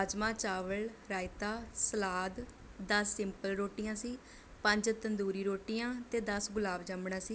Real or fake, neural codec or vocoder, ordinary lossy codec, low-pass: real; none; none; none